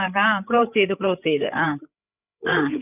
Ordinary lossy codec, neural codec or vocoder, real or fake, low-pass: none; codec, 16 kHz, 8 kbps, FreqCodec, larger model; fake; 3.6 kHz